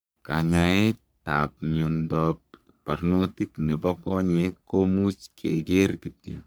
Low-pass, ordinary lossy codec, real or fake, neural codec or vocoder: none; none; fake; codec, 44.1 kHz, 3.4 kbps, Pupu-Codec